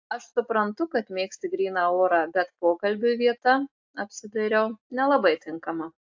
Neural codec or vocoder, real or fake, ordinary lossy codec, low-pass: none; real; AAC, 48 kbps; 7.2 kHz